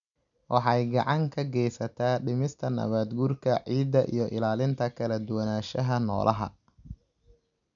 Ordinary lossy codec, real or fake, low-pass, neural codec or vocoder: MP3, 96 kbps; real; 7.2 kHz; none